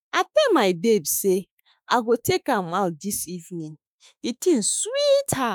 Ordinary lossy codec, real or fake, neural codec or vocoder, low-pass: none; fake; autoencoder, 48 kHz, 32 numbers a frame, DAC-VAE, trained on Japanese speech; none